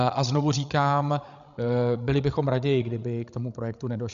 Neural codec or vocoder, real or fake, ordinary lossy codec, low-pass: codec, 16 kHz, 16 kbps, FreqCodec, larger model; fake; MP3, 96 kbps; 7.2 kHz